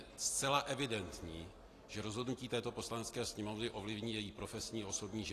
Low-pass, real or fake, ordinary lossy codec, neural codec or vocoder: 14.4 kHz; real; AAC, 48 kbps; none